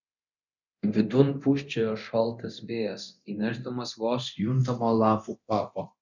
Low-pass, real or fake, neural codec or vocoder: 7.2 kHz; fake; codec, 24 kHz, 0.9 kbps, DualCodec